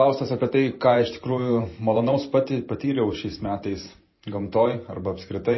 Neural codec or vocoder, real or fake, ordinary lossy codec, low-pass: vocoder, 44.1 kHz, 128 mel bands every 512 samples, BigVGAN v2; fake; MP3, 24 kbps; 7.2 kHz